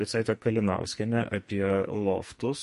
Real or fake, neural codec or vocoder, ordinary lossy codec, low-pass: fake; codec, 44.1 kHz, 2.6 kbps, SNAC; MP3, 48 kbps; 14.4 kHz